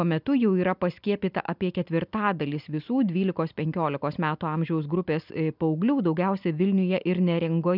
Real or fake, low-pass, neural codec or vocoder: real; 5.4 kHz; none